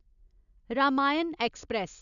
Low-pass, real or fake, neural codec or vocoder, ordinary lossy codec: 7.2 kHz; real; none; none